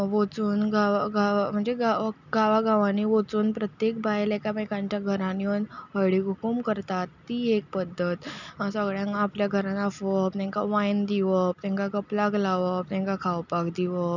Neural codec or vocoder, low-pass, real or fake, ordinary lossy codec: none; 7.2 kHz; real; none